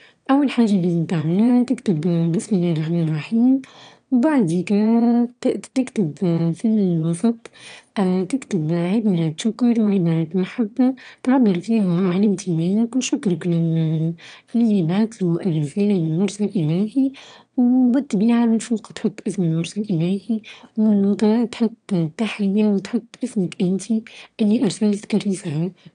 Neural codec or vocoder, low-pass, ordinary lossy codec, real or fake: autoencoder, 22.05 kHz, a latent of 192 numbers a frame, VITS, trained on one speaker; 9.9 kHz; none; fake